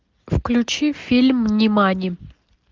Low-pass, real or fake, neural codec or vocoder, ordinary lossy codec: 7.2 kHz; real; none; Opus, 32 kbps